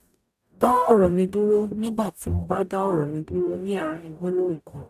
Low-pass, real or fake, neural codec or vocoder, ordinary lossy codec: 14.4 kHz; fake; codec, 44.1 kHz, 0.9 kbps, DAC; AAC, 96 kbps